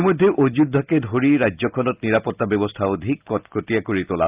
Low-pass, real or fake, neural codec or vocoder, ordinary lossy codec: 3.6 kHz; real; none; Opus, 24 kbps